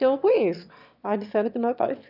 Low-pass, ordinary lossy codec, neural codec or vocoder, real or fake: 5.4 kHz; none; autoencoder, 22.05 kHz, a latent of 192 numbers a frame, VITS, trained on one speaker; fake